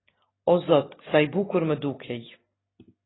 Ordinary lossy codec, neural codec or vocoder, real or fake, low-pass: AAC, 16 kbps; none; real; 7.2 kHz